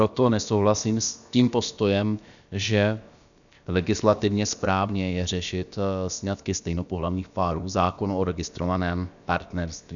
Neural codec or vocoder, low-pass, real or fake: codec, 16 kHz, about 1 kbps, DyCAST, with the encoder's durations; 7.2 kHz; fake